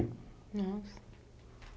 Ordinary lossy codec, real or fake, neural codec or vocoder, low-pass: none; real; none; none